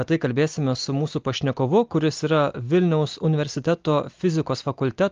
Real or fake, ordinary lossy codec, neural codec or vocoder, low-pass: real; Opus, 32 kbps; none; 7.2 kHz